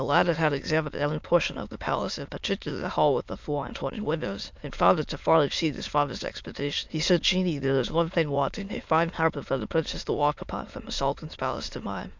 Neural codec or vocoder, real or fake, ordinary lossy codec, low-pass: autoencoder, 22.05 kHz, a latent of 192 numbers a frame, VITS, trained on many speakers; fake; MP3, 64 kbps; 7.2 kHz